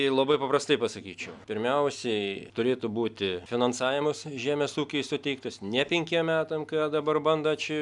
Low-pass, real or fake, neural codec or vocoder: 10.8 kHz; real; none